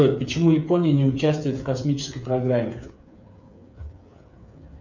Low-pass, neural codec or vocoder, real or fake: 7.2 kHz; codec, 16 kHz, 8 kbps, FreqCodec, smaller model; fake